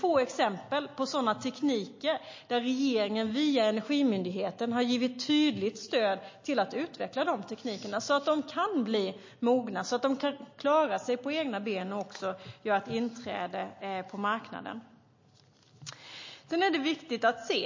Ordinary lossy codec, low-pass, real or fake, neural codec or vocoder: MP3, 32 kbps; 7.2 kHz; real; none